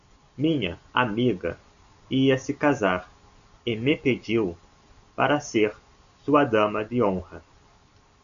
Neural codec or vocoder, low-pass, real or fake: none; 7.2 kHz; real